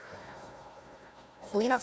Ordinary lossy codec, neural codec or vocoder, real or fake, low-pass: none; codec, 16 kHz, 1 kbps, FunCodec, trained on Chinese and English, 50 frames a second; fake; none